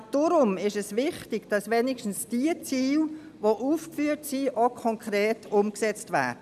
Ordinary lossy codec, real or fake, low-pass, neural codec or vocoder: none; real; 14.4 kHz; none